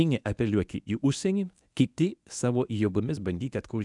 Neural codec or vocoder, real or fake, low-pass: codec, 24 kHz, 0.9 kbps, WavTokenizer, medium speech release version 1; fake; 10.8 kHz